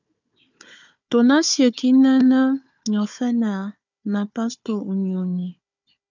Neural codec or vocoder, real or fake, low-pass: codec, 16 kHz, 4 kbps, FunCodec, trained on Chinese and English, 50 frames a second; fake; 7.2 kHz